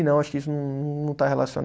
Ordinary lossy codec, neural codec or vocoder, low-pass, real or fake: none; none; none; real